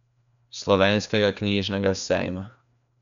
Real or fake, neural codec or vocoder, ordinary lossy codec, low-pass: fake; codec, 16 kHz, 2 kbps, FreqCodec, larger model; none; 7.2 kHz